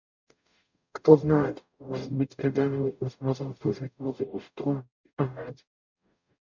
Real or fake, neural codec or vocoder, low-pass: fake; codec, 44.1 kHz, 0.9 kbps, DAC; 7.2 kHz